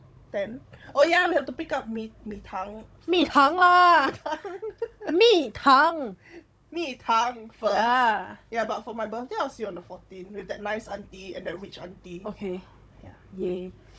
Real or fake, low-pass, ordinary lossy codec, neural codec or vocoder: fake; none; none; codec, 16 kHz, 16 kbps, FunCodec, trained on Chinese and English, 50 frames a second